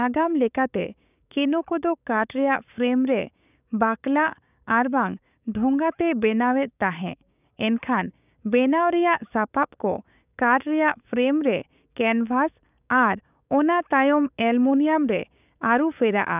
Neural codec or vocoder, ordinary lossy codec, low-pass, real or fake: codec, 16 kHz, 6 kbps, DAC; none; 3.6 kHz; fake